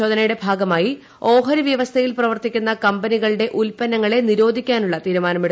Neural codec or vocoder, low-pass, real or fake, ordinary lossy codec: none; none; real; none